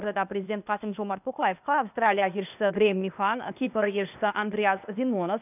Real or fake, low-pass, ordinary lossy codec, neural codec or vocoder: fake; 3.6 kHz; none; codec, 16 kHz, 0.8 kbps, ZipCodec